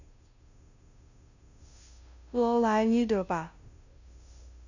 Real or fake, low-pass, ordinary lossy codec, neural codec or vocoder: fake; 7.2 kHz; none; codec, 16 kHz, 0.5 kbps, FunCodec, trained on Chinese and English, 25 frames a second